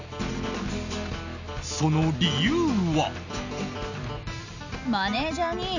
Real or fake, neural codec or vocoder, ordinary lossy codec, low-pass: real; none; none; 7.2 kHz